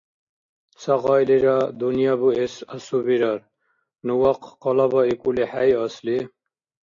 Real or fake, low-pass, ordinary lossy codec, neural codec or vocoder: real; 7.2 kHz; AAC, 48 kbps; none